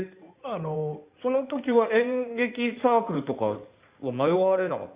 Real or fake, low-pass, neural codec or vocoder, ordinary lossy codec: fake; 3.6 kHz; codec, 16 kHz in and 24 kHz out, 2.2 kbps, FireRedTTS-2 codec; Opus, 64 kbps